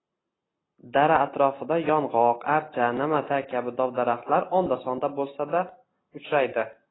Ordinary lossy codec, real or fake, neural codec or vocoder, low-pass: AAC, 16 kbps; real; none; 7.2 kHz